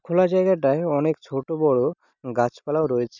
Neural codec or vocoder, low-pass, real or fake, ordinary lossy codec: none; 7.2 kHz; real; none